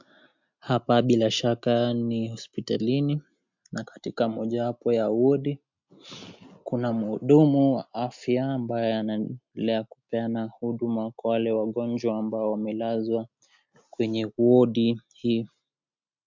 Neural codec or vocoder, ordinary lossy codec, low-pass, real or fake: none; MP3, 64 kbps; 7.2 kHz; real